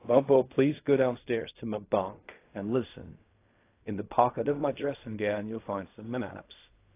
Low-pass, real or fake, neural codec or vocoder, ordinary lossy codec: 3.6 kHz; fake; codec, 16 kHz in and 24 kHz out, 0.4 kbps, LongCat-Audio-Codec, fine tuned four codebook decoder; AAC, 24 kbps